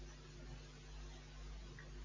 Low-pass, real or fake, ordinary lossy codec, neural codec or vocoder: 7.2 kHz; real; MP3, 32 kbps; none